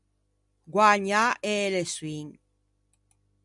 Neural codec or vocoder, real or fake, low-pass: none; real; 10.8 kHz